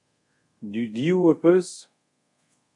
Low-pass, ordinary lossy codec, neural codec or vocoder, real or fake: 10.8 kHz; MP3, 48 kbps; codec, 24 kHz, 0.5 kbps, DualCodec; fake